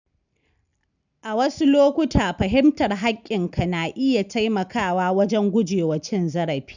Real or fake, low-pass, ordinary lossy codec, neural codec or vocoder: real; 7.2 kHz; none; none